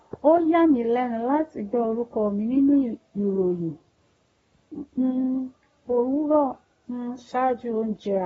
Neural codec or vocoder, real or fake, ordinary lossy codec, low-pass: codec, 44.1 kHz, 7.8 kbps, Pupu-Codec; fake; AAC, 24 kbps; 19.8 kHz